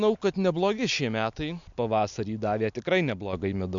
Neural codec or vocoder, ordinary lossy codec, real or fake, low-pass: none; MP3, 64 kbps; real; 7.2 kHz